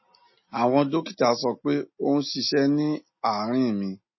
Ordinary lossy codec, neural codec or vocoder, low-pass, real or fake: MP3, 24 kbps; none; 7.2 kHz; real